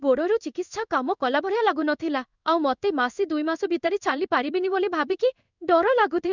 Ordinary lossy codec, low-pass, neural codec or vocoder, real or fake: none; 7.2 kHz; codec, 16 kHz in and 24 kHz out, 1 kbps, XY-Tokenizer; fake